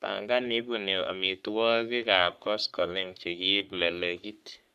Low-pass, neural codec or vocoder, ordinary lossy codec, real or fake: 14.4 kHz; codec, 44.1 kHz, 3.4 kbps, Pupu-Codec; none; fake